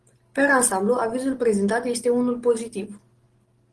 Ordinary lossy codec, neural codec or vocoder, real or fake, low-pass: Opus, 16 kbps; none; real; 10.8 kHz